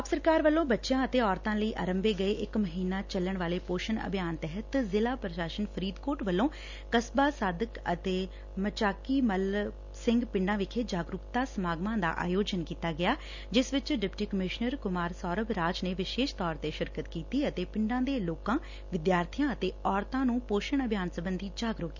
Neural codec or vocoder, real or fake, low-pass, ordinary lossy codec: none; real; 7.2 kHz; none